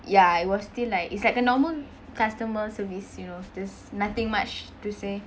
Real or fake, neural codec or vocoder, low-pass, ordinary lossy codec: real; none; none; none